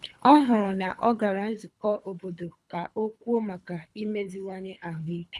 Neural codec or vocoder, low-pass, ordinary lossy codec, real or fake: codec, 24 kHz, 3 kbps, HILCodec; none; none; fake